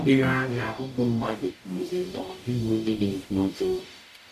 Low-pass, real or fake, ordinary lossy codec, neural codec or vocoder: 14.4 kHz; fake; none; codec, 44.1 kHz, 0.9 kbps, DAC